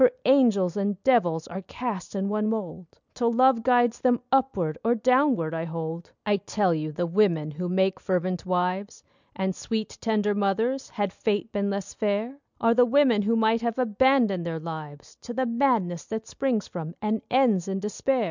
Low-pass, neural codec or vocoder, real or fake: 7.2 kHz; none; real